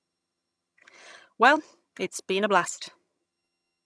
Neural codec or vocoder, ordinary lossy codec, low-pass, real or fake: vocoder, 22.05 kHz, 80 mel bands, HiFi-GAN; none; none; fake